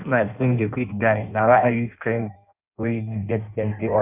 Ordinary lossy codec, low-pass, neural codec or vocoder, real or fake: none; 3.6 kHz; codec, 16 kHz in and 24 kHz out, 0.6 kbps, FireRedTTS-2 codec; fake